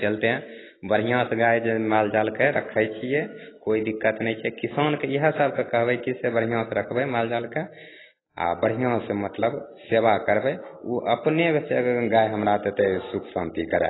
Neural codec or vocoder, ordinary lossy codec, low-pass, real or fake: none; AAC, 16 kbps; 7.2 kHz; real